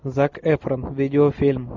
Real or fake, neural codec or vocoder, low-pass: real; none; 7.2 kHz